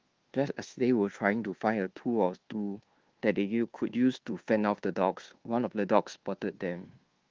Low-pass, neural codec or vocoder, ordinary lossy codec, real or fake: 7.2 kHz; codec, 24 kHz, 1.2 kbps, DualCodec; Opus, 16 kbps; fake